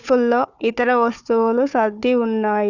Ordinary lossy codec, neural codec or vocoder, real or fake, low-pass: none; codec, 16 kHz, 4 kbps, FunCodec, trained on Chinese and English, 50 frames a second; fake; 7.2 kHz